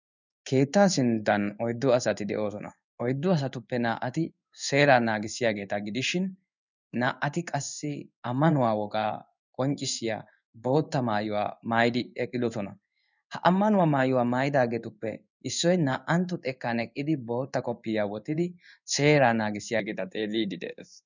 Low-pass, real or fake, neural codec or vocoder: 7.2 kHz; fake; codec, 16 kHz in and 24 kHz out, 1 kbps, XY-Tokenizer